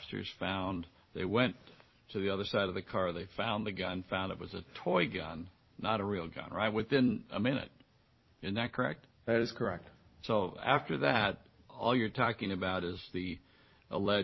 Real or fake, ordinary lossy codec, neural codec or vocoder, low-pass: fake; MP3, 24 kbps; vocoder, 44.1 kHz, 128 mel bands every 256 samples, BigVGAN v2; 7.2 kHz